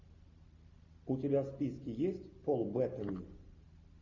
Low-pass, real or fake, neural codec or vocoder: 7.2 kHz; real; none